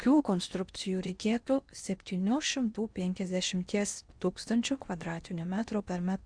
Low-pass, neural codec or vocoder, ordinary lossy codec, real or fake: 9.9 kHz; codec, 16 kHz in and 24 kHz out, 0.8 kbps, FocalCodec, streaming, 65536 codes; Opus, 64 kbps; fake